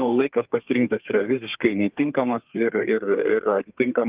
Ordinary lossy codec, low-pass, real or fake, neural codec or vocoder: Opus, 24 kbps; 3.6 kHz; fake; codec, 32 kHz, 1.9 kbps, SNAC